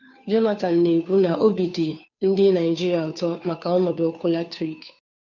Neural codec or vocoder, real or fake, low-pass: codec, 16 kHz, 2 kbps, FunCodec, trained on Chinese and English, 25 frames a second; fake; 7.2 kHz